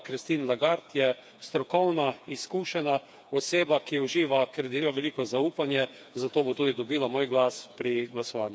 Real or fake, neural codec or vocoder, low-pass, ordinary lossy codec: fake; codec, 16 kHz, 4 kbps, FreqCodec, smaller model; none; none